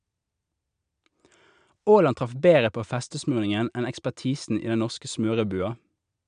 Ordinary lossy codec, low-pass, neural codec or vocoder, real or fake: none; 9.9 kHz; none; real